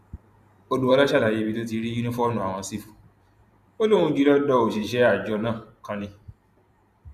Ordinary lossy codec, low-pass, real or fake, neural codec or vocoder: none; 14.4 kHz; fake; vocoder, 44.1 kHz, 128 mel bands every 512 samples, BigVGAN v2